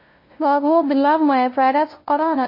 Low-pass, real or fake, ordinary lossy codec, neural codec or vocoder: 5.4 kHz; fake; MP3, 24 kbps; codec, 16 kHz, 0.5 kbps, FunCodec, trained on LibriTTS, 25 frames a second